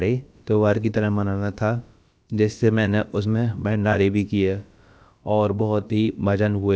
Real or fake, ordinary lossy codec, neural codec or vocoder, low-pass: fake; none; codec, 16 kHz, about 1 kbps, DyCAST, with the encoder's durations; none